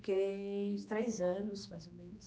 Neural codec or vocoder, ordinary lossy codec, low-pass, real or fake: codec, 16 kHz, 2 kbps, X-Codec, HuBERT features, trained on general audio; none; none; fake